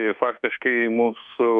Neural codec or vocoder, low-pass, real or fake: codec, 24 kHz, 1.2 kbps, DualCodec; 10.8 kHz; fake